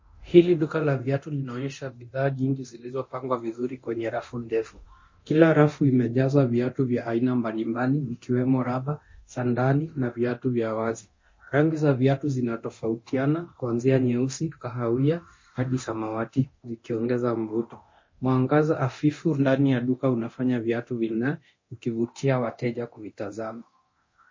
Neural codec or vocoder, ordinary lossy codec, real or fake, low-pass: codec, 24 kHz, 0.9 kbps, DualCodec; MP3, 32 kbps; fake; 7.2 kHz